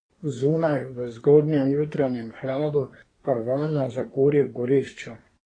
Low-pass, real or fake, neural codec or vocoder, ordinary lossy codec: 9.9 kHz; fake; codec, 24 kHz, 1 kbps, SNAC; AAC, 32 kbps